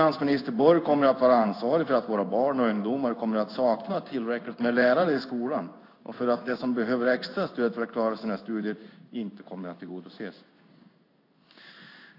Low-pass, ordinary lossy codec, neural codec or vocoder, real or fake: 5.4 kHz; AAC, 32 kbps; codec, 16 kHz in and 24 kHz out, 1 kbps, XY-Tokenizer; fake